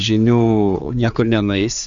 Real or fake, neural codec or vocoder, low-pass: fake; codec, 16 kHz, 4 kbps, X-Codec, HuBERT features, trained on general audio; 7.2 kHz